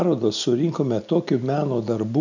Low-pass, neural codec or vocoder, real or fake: 7.2 kHz; none; real